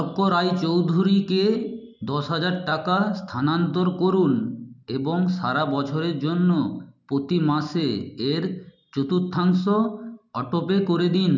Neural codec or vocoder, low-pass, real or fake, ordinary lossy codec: none; 7.2 kHz; real; none